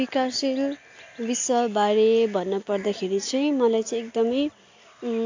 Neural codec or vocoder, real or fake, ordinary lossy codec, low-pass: none; real; AAC, 48 kbps; 7.2 kHz